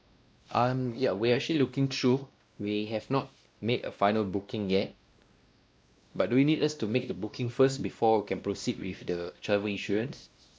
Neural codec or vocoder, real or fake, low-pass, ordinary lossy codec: codec, 16 kHz, 1 kbps, X-Codec, WavLM features, trained on Multilingual LibriSpeech; fake; none; none